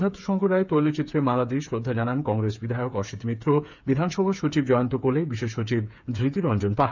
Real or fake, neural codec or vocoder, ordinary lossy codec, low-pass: fake; codec, 16 kHz, 8 kbps, FreqCodec, smaller model; none; 7.2 kHz